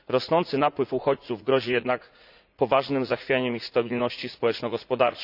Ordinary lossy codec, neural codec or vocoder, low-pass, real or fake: none; vocoder, 44.1 kHz, 80 mel bands, Vocos; 5.4 kHz; fake